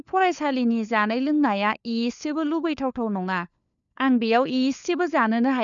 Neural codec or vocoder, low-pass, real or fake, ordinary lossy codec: codec, 16 kHz, 4 kbps, FunCodec, trained on LibriTTS, 50 frames a second; 7.2 kHz; fake; none